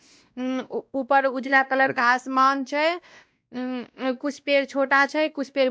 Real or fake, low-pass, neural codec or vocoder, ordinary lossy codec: fake; none; codec, 16 kHz, 1 kbps, X-Codec, WavLM features, trained on Multilingual LibriSpeech; none